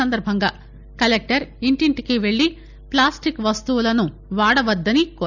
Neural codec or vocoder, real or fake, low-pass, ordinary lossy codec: none; real; 7.2 kHz; none